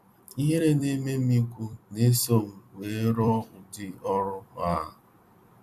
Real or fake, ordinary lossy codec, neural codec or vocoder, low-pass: fake; none; vocoder, 44.1 kHz, 128 mel bands every 256 samples, BigVGAN v2; 14.4 kHz